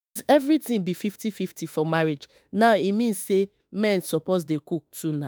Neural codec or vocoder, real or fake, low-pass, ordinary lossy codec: autoencoder, 48 kHz, 32 numbers a frame, DAC-VAE, trained on Japanese speech; fake; none; none